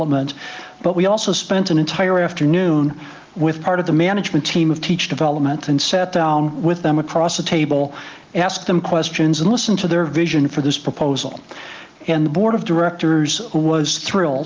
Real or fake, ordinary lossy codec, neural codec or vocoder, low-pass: real; Opus, 32 kbps; none; 7.2 kHz